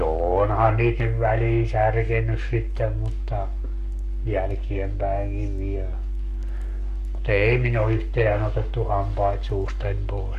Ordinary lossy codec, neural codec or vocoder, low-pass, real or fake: none; codec, 44.1 kHz, 7.8 kbps, Pupu-Codec; 14.4 kHz; fake